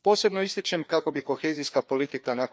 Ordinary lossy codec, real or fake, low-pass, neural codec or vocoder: none; fake; none; codec, 16 kHz, 2 kbps, FreqCodec, larger model